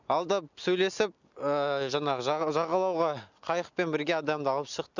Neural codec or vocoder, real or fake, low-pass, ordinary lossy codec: none; real; 7.2 kHz; none